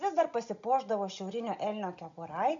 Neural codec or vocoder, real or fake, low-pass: none; real; 7.2 kHz